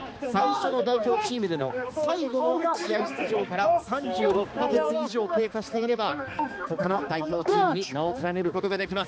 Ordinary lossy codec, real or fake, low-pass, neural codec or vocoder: none; fake; none; codec, 16 kHz, 2 kbps, X-Codec, HuBERT features, trained on balanced general audio